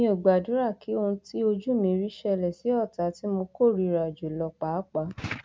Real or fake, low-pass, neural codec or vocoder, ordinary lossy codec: real; none; none; none